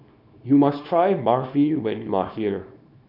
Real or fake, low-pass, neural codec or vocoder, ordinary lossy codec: fake; 5.4 kHz; codec, 24 kHz, 0.9 kbps, WavTokenizer, small release; none